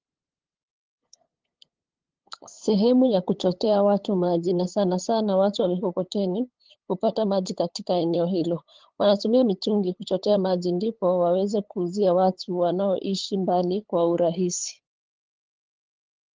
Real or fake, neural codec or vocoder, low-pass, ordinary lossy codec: fake; codec, 16 kHz, 8 kbps, FunCodec, trained on LibriTTS, 25 frames a second; 7.2 kHz; Opus, 16 kbps